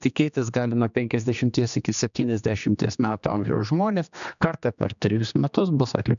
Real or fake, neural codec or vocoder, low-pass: fake; codec, 16 kHz, 2 kbps, X-Codec, HuBERT features, trained on general audio; 7.2 kHz